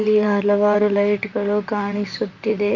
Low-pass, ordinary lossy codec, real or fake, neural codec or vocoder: 7.2 kHz; none; fake; vocoder, 44.1 kHz, 128 mel bands, Pupu-Vocoder